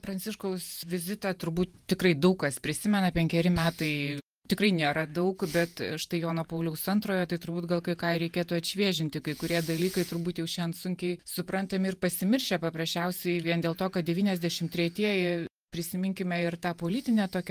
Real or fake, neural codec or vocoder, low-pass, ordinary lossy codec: fake; vocoder, 48 kHz, 128 mel bands, Vocos; 14.4 kHz; Opus, 32 kbps